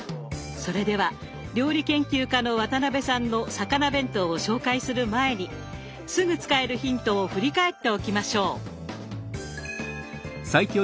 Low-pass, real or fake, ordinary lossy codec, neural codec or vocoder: none; real; none; none